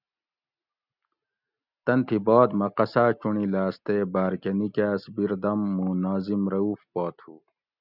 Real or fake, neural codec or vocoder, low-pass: real; none; 5.4 kHz